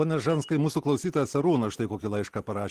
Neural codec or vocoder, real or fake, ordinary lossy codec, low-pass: none; real; Opus, 16 kbps; 14.4 kHz